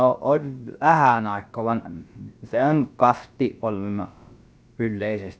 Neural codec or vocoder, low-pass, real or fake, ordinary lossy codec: codec, 16 kHz, about 1 kbps, DyCAST, with the encoder's durations; none; fake; none